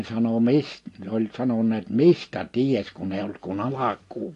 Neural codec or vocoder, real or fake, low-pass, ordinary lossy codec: none; real; 10.8 kHz; AAC, 48 kbps